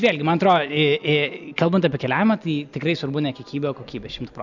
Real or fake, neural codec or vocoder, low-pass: real; none; 7.2 kHz